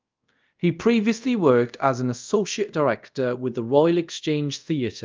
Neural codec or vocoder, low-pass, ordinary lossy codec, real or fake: codec, 24 kHz, 0.5 kbps, DualCodec; 7.2 kHz; Opus, 24 kbps; fake